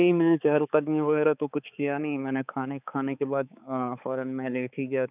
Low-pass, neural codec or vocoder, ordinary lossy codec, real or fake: 3.6 kHz; codec, 16 kHz, 4 kbps, X-Codec, HuBERT features, trained on balanced general audio; none; fake